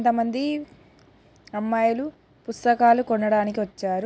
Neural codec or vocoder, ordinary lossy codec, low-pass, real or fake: none; none; none; real